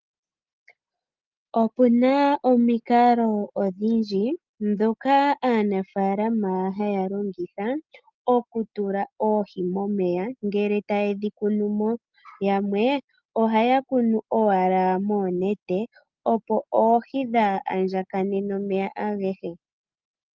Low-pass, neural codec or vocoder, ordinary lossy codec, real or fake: 7.2 kHz; none; Opus, 32 kbps; real